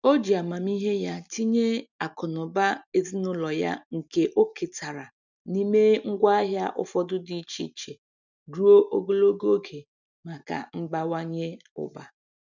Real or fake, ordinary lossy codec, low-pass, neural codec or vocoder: real; none; 7.2 kHz; none